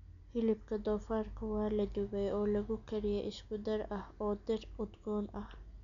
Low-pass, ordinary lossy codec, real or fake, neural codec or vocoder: 7.2 kHz; MP3, 96 kbps; real; none